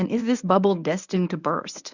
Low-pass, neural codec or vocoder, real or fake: 7.2 kHz; codec, 24 kHz, 0.9 kbps, WavTokenizer, medium speech release version 1; fake